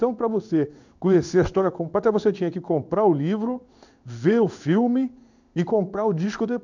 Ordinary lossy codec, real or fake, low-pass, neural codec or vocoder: none; fake; 7.2 kHz; codec, 16 kHz in and 24 kHz out, 1 kbps, XY-Tokenizer